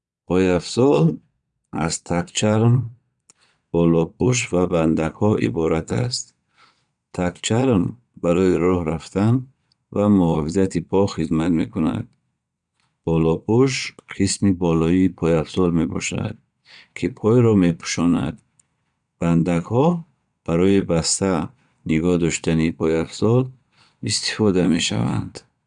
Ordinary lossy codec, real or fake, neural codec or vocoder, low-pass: none; fake; vocoder, 22.05 kHz, 80 mel bands, Vocos; 9.9 kHz